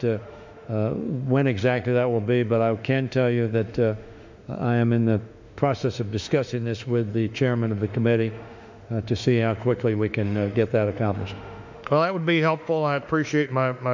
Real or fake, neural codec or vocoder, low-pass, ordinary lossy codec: fake; autoencoder, 48 kHz, 32 numbers a frame, DAC-VAE, trained on Japanese speech; 7.2 kHz; MP3, 64 kbps